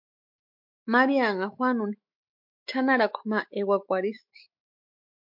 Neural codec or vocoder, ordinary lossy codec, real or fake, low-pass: autoencoder, 48 kHz, 128 numbers a frame, DAC-VAE, trained on Japanese speech; MP3, 48 kbps; fake; 5.4 kHz